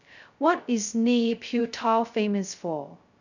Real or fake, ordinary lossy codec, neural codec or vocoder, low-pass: fake; none; codec, 16 kHz, 0.2 kbps, FocalCodec; 7.2 kHz